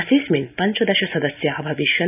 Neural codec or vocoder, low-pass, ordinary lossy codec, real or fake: none; 3.6 kHz; none; real